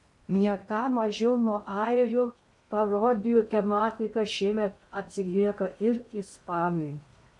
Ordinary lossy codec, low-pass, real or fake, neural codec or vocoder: MP3, 64 kbps; 10.8 kHz; fake; codec, 16 kHz in and 24 kHz out, 0.6 kbps, FocalCodec, streaming, 2048 codes